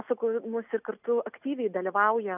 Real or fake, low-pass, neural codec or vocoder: real; 3.6 kHz; none